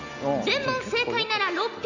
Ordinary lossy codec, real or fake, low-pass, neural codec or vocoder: none; real; 7.2 kHz; none